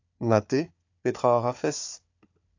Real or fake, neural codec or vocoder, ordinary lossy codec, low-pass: fake; codec, 16 kHz, 4 kbps, FunCodec, trained on Chinese and English, 50 frames a second; AAC, 48 kbps; 7.2 kHz